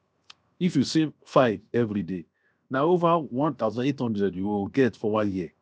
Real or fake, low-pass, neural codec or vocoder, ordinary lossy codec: fake; none; codec, 16 kHz, 0.7 kbps, FocalCodec; none